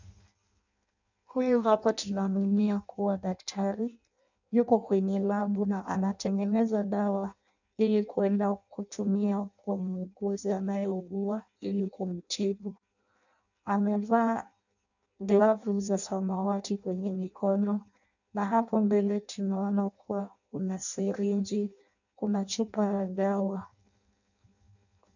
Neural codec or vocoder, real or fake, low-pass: codec, 16 kHz in and 24 kHz out, 0.6 kbps, FireRedTTS-2 codec; fake; 7.2 kHz